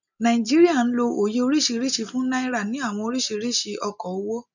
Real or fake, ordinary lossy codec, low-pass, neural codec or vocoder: real; none; 7.2 kHz; none